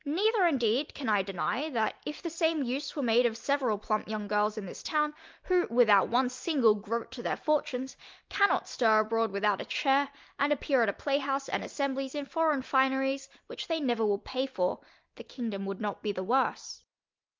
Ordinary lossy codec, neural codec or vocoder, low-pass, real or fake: Opus, 32 kbps; none; 7.2 kHz; real